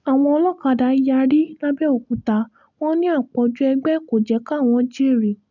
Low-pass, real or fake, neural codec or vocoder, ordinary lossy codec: 7.2 kHz; real; none; none